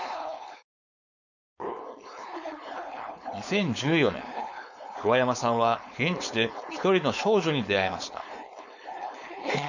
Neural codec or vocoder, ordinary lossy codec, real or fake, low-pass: codec, 16 kHz, 4.8 kbps, FACodec; none; fake; 7.2 kHz